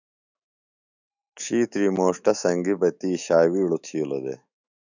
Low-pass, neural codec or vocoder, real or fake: 7.2 kHz; autoencoder, 48 kHz, 128 numbers a frame, DAC-VAE, trained on Japanese speech; fake